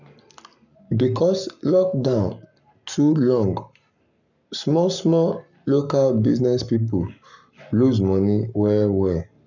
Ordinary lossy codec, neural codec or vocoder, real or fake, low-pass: none; codec, 44.1 kHz, 7.8 kbps, Pupu-Codec; fake; 7.2 kHz